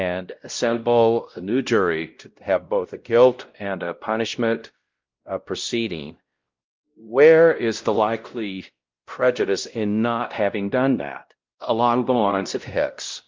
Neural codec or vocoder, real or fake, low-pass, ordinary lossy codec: codec, 16 kHz, 0.5 kbps, X-Codec, WavLM features, trained on Multilingual LibriSpeech; fake; 7.2 kHz; Opus, 32 kbps